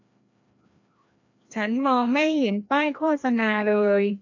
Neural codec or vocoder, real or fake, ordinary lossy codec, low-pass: codec, 16 kHz, 1 kbps, FreqCodec, larger model; fake; AAC, 48 kbps; 7.2 kHz